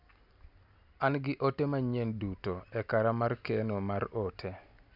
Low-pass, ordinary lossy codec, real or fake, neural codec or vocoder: 5.4 kHz; none; real; none